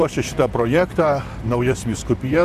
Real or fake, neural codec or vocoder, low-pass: fake; vocoder, 44.1 kHz, 128 mel bands, Pupu-Vocoder; 14.4 kHz